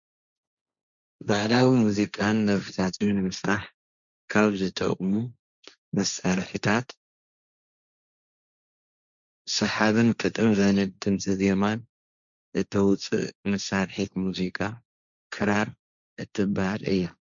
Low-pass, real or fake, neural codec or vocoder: 7.2 kHz; fake; codec, 16 kHz, 1.1 kbps, Voila-Tokenizer